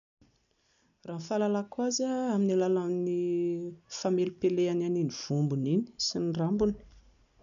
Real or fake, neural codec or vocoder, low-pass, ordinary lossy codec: real; none; 7.2 kHz; none